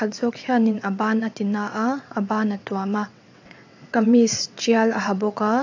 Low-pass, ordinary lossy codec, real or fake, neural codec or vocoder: 7.2 kHz; AAC, 48 kbps; fake; vocoder, 22.05 kHz, 80 mel bands, WaveNeXt